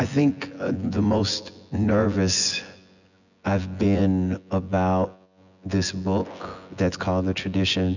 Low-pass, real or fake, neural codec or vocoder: 7.2 kHz; fake; vocoder, 24 kHz, 100 mel bands, Vocos